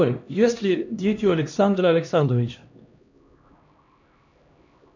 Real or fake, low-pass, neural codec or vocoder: fake; 7.2 kHz; codec, 16 kHz, 1 kbps, X-Codec, HuBERT features, trained on LibriSpeech